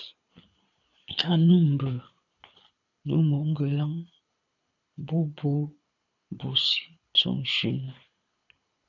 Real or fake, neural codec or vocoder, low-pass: fake; codec, 24 kHz, 6 kbps, HILCodec; 7.2 kHz